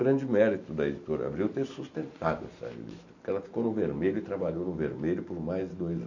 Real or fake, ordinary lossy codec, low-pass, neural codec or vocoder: real; none; 7.2 kHz; none